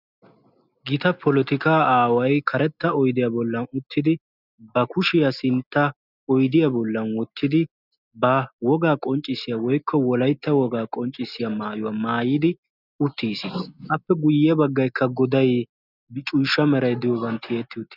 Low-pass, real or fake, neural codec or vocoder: 5.4 kHz; real; none